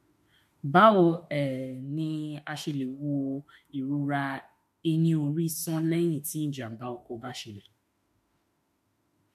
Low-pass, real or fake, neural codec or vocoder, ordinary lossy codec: 14.4 kHz; fake; autoencoder, 48 kHz, 32 numbers a frame, DAC-VAE, trained on Japanese speech; MP3, 64 kbps